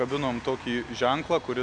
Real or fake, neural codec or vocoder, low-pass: real; none; 9.9 kHz